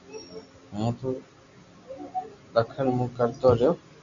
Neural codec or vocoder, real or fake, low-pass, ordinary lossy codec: none; real; 7.2 kHz; Opus, 64 kbps